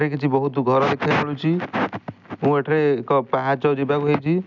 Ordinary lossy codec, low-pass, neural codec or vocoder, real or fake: none; 7.2 kHz; none; real